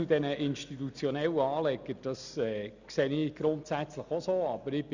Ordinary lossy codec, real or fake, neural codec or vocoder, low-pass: none; real; none; 7.2 kHz